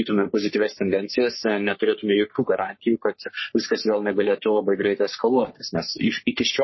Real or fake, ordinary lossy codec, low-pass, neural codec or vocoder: fake; MP3, 24 kbps; 7.2 kHz; codec, 44.1 kHz, 3.4 kbps, Pupu-Codec